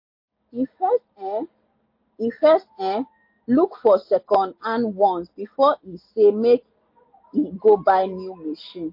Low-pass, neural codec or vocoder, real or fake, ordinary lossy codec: 5.4 kHz; none; real; MP3, 32 kbps